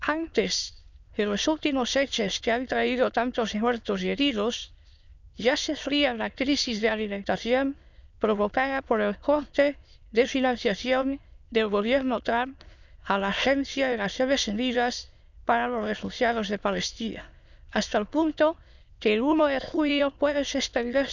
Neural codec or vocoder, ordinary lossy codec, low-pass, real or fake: autoencoder, 22.05 kHz, a latent of 192 numbers a frame, VITS, trained on many speakers; none; 7.2 kHz; fake